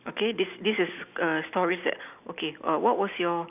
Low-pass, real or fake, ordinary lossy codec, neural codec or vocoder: 3.6 kHz; real; none; none